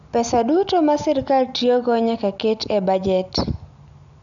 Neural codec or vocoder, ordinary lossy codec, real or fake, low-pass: none; none; real; 7.2 kHz